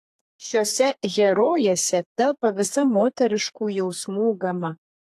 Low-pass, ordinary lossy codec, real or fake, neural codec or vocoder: 14.4 kHz; AAC, 64 kbps; fake; codec, 32 kHz, 1.9 kbps, SNAC